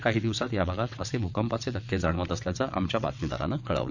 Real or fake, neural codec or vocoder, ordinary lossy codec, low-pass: fake; vocoder, 22.05 kHz, 80 mel bands, WaveNeXt; none; 7.2 kHz